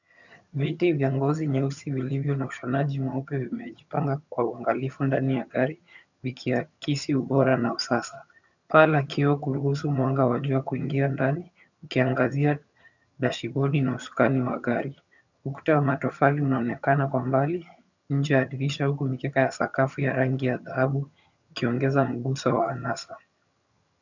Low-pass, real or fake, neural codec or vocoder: 7.2 kHz; fake; vocoder, 22.05 kHz, 80 mel bands, HiFi-GAN